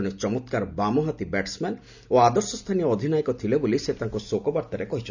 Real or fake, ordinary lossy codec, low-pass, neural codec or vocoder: real; none; 7.2 kHz; none